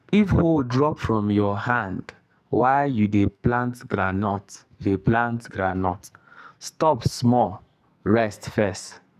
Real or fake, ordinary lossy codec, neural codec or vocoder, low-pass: fake; none; codec, 44.1 kHz, 2.6 kbps, SNAC; 14.4 kHz